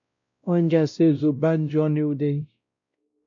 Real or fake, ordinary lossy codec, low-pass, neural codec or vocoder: fake; MP3, 64 kbps; 7.2 kHz; codec, 16 kHz, 0.5 kbps, X-Codec, WavLM features, trained on Multilingual LibriSpeech